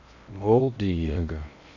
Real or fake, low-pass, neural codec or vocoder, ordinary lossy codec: fake; 7.2 kHz; codec, 16 kHz in and 24 kHz out, 0.6 kbps, FocalCodec, streaming, 2048 codes; none